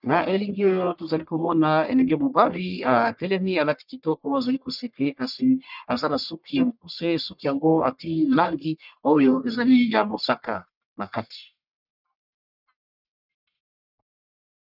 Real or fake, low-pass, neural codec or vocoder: fake; 5.4 kHz; codec, 44.1 kHz, 1.7 kbps, Pupu-Codec